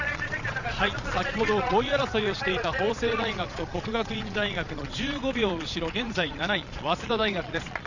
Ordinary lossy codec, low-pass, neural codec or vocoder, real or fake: none; 7.2 kHz; vocoder, 22.05 kHz, 80 mel bands, Vocos; fake